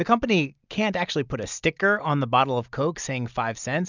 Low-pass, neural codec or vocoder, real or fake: 7.2 kHz; none; real